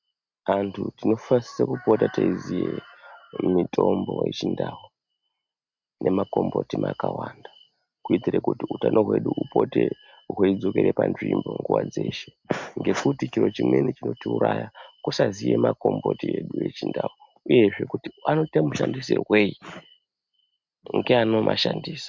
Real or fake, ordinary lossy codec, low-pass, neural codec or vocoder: real; MP3, 64 kbps; 7.2 kHz; none